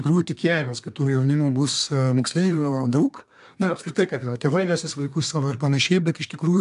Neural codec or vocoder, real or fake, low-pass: codec, 24 kHz, 1 kbps, SNAC; fake; 10.8 kHz